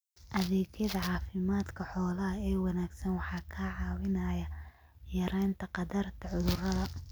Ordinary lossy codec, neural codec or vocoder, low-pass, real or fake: none; none; none; real